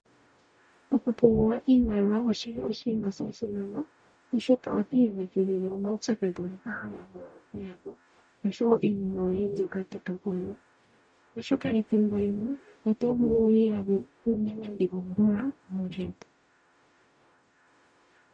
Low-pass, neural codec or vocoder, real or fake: 9.9 kHz; codec, 44.1 kHz, 0.9 kbps, DAC; fake